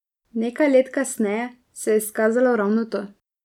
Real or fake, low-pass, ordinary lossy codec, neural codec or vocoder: real; 19.8 kHz; none; none